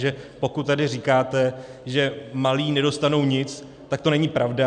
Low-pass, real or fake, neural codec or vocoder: 9.9 kHz; real; none